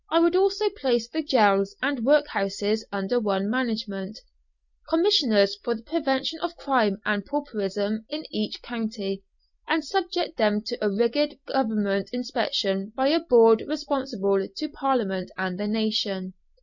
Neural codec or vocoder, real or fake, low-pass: none; real; 7.2 kHz